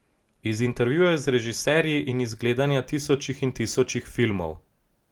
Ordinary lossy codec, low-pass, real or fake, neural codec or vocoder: Opus, 24 kbps; 19.8 kHz; real; none